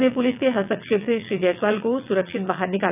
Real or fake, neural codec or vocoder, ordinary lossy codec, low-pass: fake; vocoder, 22.05 kHz, 80 mel bands, WaveNeXt; none; 3.6 kHz